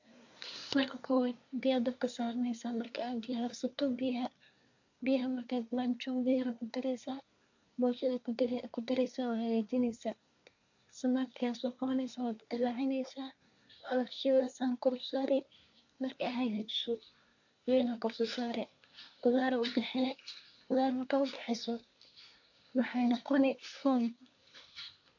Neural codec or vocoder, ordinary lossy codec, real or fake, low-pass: codec, 24 kHz, 1 kbps, SNAC; AAC, 48 kbps; fake; 7.2 kHz